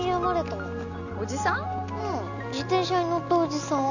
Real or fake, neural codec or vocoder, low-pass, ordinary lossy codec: real; none; 7.2 kHz; none